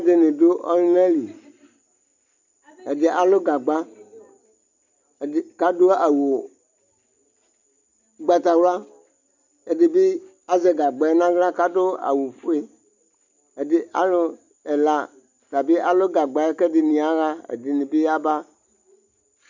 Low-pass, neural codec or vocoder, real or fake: 7.2 kHz; none; real